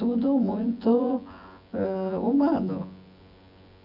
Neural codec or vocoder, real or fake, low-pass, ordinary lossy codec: vocoder, 24 kHz, 100 mel bands, Vocos; fake; 5.4 kHz; none